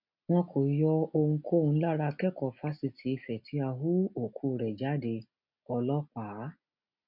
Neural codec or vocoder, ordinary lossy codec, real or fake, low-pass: none; none; real; 5.4 kHz